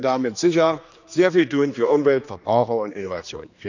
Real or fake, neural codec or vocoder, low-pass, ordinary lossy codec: fake; codec, 16 kHz, 2 kbps, X-Codec, HuBERT features, trained on general audio; 7.2 kHz; none